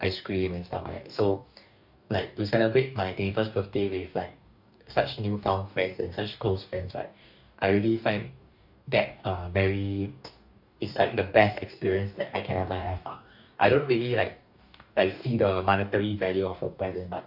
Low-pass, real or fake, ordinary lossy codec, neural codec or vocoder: 5.4 kHz; fake; none; codec, 44.1 kHz, 2.6 kbps, DAC